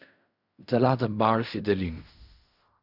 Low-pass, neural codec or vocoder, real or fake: 5.4 kHz; codec, 16 kHz in and 24 kHz out, 0.4 kbps, LongCat-Audio-Codec, fine tuned four codebook decoder; fake